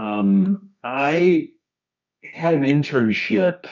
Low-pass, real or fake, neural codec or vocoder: 7.2 kHz; fake; codec, 24 kHz, 0.9 kbps, WavTokenizer, medium music audio release